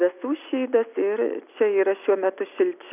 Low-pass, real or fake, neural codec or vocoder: 3.6 kHz; real; none